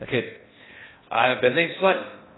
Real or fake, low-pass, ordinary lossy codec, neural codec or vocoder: fake; 7.2 kHz; AAC, 16 kbps; codec, 16 kHz in and 24 kHz out, 0.6 kbps, FocalCodec, streaming, 4096 codes